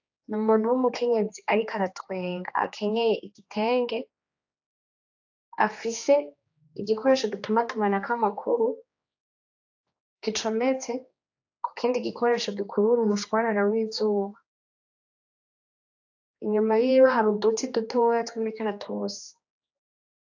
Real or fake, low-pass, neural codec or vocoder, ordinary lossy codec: fake; 7.2 kHz; codec, 16 kHz, 2 kbps, X-Codec, HuBERT features, trained on general audio; AAC, 48 kbps